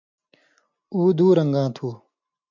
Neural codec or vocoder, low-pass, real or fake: none; 7.2 kHz; real